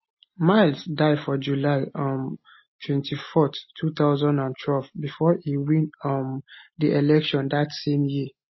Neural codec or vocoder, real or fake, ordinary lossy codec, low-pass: none; real; MP3, 24 kbps; 7.2 kHz